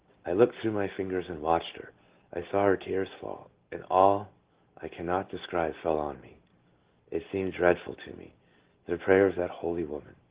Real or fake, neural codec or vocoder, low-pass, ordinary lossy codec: real; none; 3.6 kHz; Opus, 16 kbps